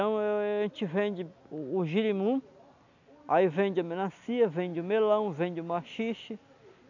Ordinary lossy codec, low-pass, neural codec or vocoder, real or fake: none; 7.2 kHz; none; real